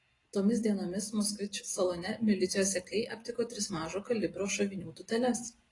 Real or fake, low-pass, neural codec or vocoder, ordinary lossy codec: real; 10.8 kHz; none; AAC, 32 kbps